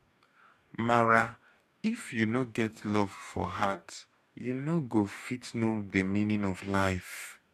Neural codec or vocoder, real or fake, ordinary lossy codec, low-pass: codec, 44.1 kHz, 2.6 kbps, DAC; fake; none; 14.4 kHz